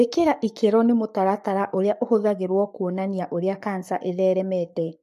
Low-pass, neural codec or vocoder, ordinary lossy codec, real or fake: 14.4 kHz; codec, 44.1 kHz, 7.8 kbps, Pupu-Codec; MP3, 64 kbps; fake